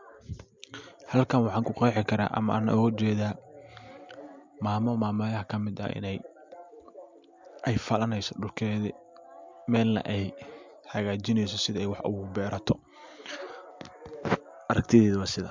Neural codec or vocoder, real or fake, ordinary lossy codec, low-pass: none; real; MP3, 64 kbps; 7.2 kHz